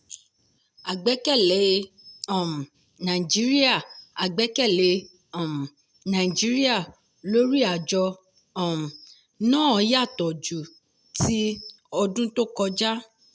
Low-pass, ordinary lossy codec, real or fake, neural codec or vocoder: none; none; real; none